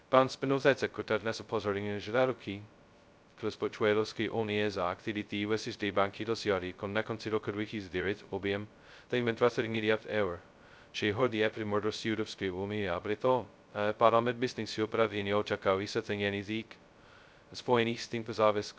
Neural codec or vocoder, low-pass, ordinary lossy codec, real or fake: codec, 16 kHz, 0.2 kbps, FocalCodec; none; none; fake